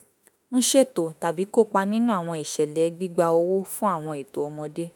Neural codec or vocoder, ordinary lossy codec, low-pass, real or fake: autoencoder, 48 kHz, 32 numbers a frame, DAC-VAE, trained on Japanese speech; none; none; fake